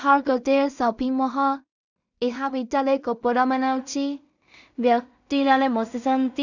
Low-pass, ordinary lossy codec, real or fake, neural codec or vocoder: 7.2 kHz; none; fake; codec, 16 kHz in and 24 kHz out, 0.4 kbps, LongCat-Audio-Codec, two codebook decoder